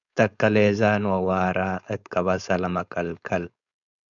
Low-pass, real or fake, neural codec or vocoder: 7.2 kHz; fake; codec, 16 kHz, 4.8 kbps, FACodec